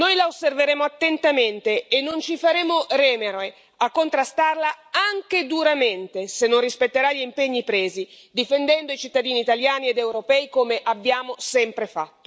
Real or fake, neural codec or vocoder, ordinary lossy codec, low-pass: real; none; none; none